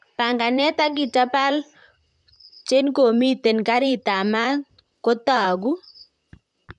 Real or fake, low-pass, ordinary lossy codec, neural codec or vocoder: fake; 10.8 kHz; none; vocoder, 44.1 kHz, 128 mel bands, Pupu-Vocoder